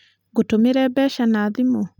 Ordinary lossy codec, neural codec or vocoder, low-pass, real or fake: none; none; 19.8 kHz; real